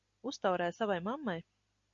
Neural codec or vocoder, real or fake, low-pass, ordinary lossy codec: none; real; 7.2 kHz; Opus, 64 kbps